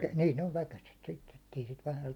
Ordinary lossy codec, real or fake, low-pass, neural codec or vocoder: none; real; 19.8 kHz; none